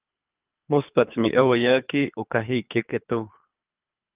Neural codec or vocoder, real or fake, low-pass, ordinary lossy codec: codec, 24 kHz, 3 kbps, HILCodec; fake; 3.6 kHz; Opus, 24 kbps